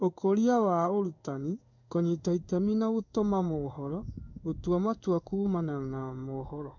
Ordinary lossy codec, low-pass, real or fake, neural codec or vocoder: none; 7.2 kHz; fake; codec, 16 kHz in and 24 kHz out, 1 kbps, XY-Tokenizer